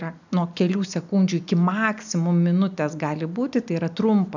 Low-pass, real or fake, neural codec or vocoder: 7.2 kHz; real; none